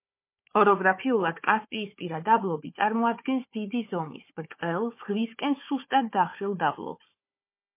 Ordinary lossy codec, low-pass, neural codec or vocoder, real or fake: MP3, 24 kbps; 3.6 kHz; codec, 16 kHz, 4 kbps, FunCodec, trained on Chinese and English, 50 frames a second; fake